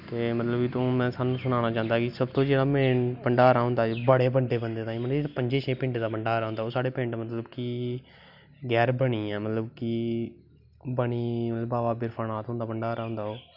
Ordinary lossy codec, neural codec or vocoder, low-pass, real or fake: none; none; 5.4 kHz; real